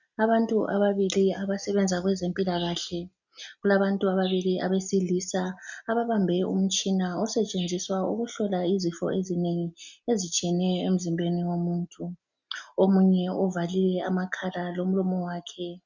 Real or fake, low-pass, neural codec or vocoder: real; 7.2 kHz; none